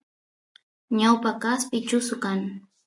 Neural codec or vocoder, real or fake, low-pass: none; real; 10.8 kHz